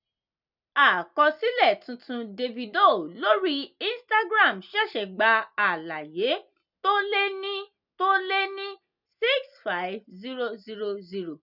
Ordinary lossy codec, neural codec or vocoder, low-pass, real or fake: none; none; 5.4 kHz; real